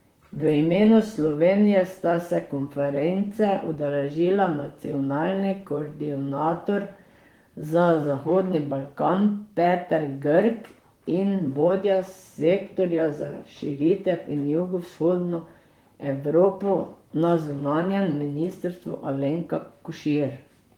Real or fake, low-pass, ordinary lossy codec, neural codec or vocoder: fake; 19.8 kHz; Opus, 24 kbps; vocoder, 44.1 kHz, 128 mel bands, Pupu-Vocoder